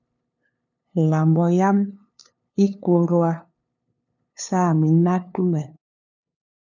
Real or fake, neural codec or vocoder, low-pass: fake; codec, 16 kHz, 2 kbps, FunCodec, trained on LibriTTS, 25 frames a second; 7.2 kHz